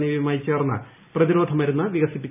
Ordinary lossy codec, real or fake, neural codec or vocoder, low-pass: none; real; none; 3.6 kHz